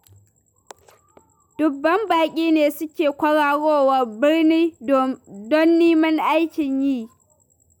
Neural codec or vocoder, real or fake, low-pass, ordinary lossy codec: none; real; 19.8 kHz; none